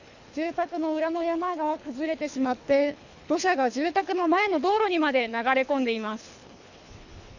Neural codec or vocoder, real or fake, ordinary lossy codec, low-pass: codec, 24 kHz, 3 kbps, HILCodec; fake; none; 7.2 kHz